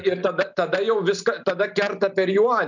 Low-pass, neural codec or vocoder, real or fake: 7.2 kHz; none; real